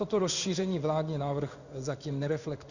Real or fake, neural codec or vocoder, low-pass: fake; codec, 16 kHz in and 24 kHz out, 1 kbps, XY-Tokenizer; 7.2 kHz